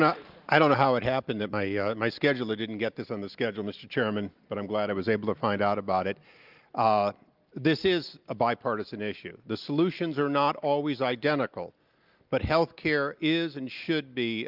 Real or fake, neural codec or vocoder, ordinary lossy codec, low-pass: real; none; Opus, 24 kbps; 5.4 kHz